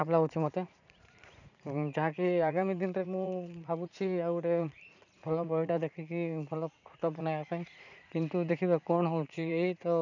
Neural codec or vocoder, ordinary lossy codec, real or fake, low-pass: vocoder, 44.1 kHz, 80 mel bands, Vocos; none; fake; 7.2 kHz